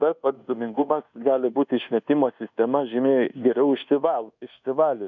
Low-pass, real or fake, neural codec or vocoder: 7.2 kHz; fake; codec, 24 kHz, 1.2 kbps, DualCodec